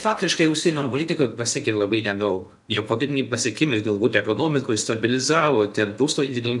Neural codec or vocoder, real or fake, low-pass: codec, 16 kHz in and 24 kHz out, 0.6 kbps, FocalCodec, streaming, 4096 codes; fake; 10.8 kHz